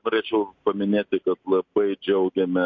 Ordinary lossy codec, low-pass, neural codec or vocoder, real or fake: MP3, 48 kbps; 7.2 kHz; none; real